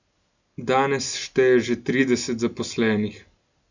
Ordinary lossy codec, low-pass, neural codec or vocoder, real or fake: none; 7.2 kHz; none; real